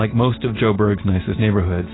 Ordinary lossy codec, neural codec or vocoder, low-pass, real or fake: AAC, 16 kbps; none; 7.2 kHz; real